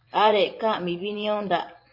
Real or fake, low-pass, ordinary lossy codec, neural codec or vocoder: fake; 5.4 kHz; MP3, 32 kbps; vocoder, 24 kHz, 100 mel bands, Vocos